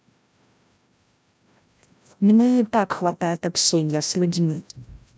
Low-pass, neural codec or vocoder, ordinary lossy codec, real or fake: none; codec, 16 kHz, 0.5 kbps, FreqCodec, larger model; none; fake